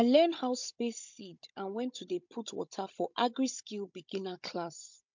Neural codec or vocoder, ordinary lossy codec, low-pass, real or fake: codec, 16 kHz, 16 kbps, FunCodec, trained on Chinese and English, 50 frames a second; MP3, 64 kbps; 7.2 kHz; fake